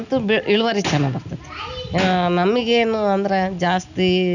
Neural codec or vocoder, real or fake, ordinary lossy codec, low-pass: none; real; none; 7.2 kHz